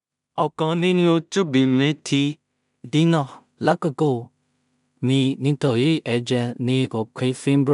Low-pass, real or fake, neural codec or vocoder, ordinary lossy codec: 10.8 kHz; fake; codec, 16 kHz in and 24 kHz out, 0.4 kbps, LongCat-Audio-Codec, two codebook decoder; none